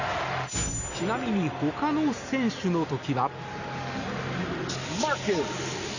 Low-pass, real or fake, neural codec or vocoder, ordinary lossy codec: 7.2 kHz; real; none; none